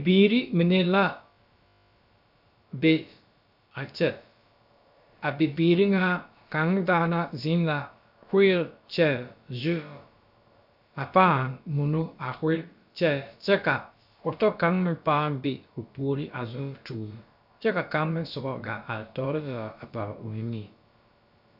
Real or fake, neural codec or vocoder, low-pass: fake; codec, 16 kHz, about 1 kbps, DyCAST, with the encoder's durations; 5.4 kHz